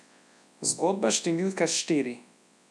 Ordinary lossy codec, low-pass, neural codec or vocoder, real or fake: none; none; codec, 24 kHz, 0.9 kbps, WavTokenizer, large speech release; fake